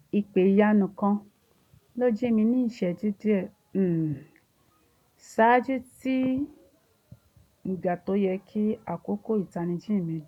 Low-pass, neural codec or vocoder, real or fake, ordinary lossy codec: 19.8 kHz; none; real; none